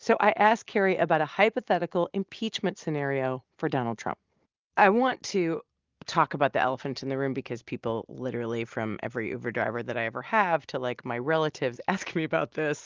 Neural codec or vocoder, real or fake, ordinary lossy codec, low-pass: none; real; Opus, 32 kbps; 7.2 kHz